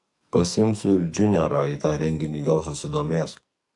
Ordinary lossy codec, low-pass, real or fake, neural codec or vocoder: AAC, 64 kbps; 10.8 kHz; fake; codec, 44.1 kHz, 2.6 kbps, SNAC